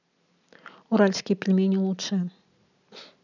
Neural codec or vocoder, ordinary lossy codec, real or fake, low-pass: none; none; real; 7.2 kHz